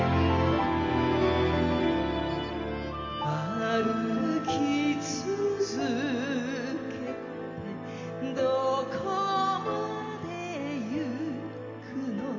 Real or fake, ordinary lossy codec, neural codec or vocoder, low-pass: real; MP3, 48 kbps; none; 7.2 kHz